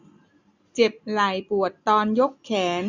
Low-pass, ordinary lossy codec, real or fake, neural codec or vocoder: 7.2 kHz; none; real; none